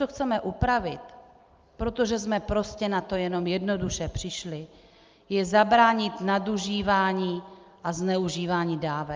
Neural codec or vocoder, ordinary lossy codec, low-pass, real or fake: none; Opus, 24 kbps; 7.2 kHz; real